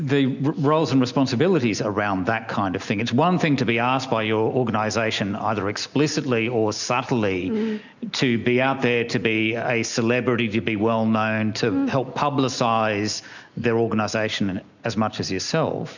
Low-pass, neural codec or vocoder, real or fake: 7.2 kHz; none; real